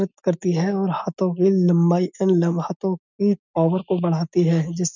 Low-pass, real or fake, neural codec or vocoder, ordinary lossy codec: 7.2 kHz; real; none; none